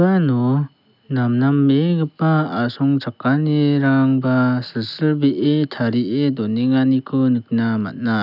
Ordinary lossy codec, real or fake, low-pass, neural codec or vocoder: none; real; 5.4 kHz; none